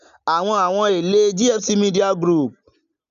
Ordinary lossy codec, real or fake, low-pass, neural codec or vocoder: none; real; 7.2 kHz; none